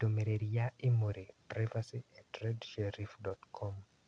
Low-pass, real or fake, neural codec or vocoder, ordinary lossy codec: 9.9 kHz; real; none; none